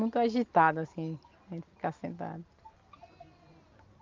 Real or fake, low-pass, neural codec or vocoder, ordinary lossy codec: real; 7.2 kHz; none; Opus, 24 kbps